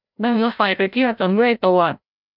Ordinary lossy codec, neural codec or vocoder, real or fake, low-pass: none; codec, 16 kHz, 0.5 kbps, FreqCodec, larger model; fake; 5.4 kHz